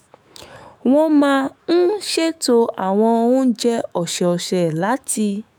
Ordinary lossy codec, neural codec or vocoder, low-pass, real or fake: none; autoencoder, 48 kHz, 128 numbers a frame, DAC-VAE, trained on Japanese speech; none; fake